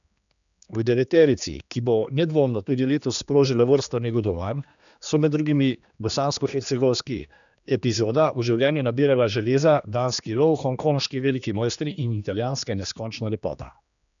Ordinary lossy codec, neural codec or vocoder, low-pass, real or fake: none; codec, 16 kHz, 2 kbps, X-Codec, HuBERT features, trained on general audio; 7.2 kHz; fake